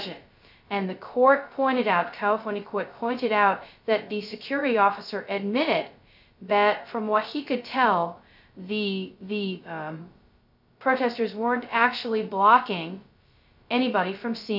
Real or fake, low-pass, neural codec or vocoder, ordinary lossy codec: fake; 5.4 kHz; codec, 16 kHz, 0.2 kbps, FocalCodec; AAC, 48 kbps